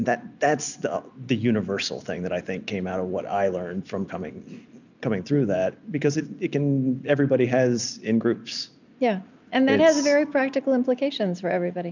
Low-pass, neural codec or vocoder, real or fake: 7.2 kHz; none; real